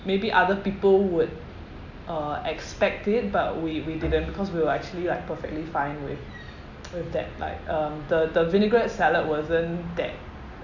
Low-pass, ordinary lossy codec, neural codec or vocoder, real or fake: 7.2 kHz; none; none; real